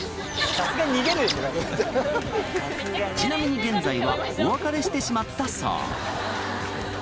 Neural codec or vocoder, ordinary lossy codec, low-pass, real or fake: none; none; none; real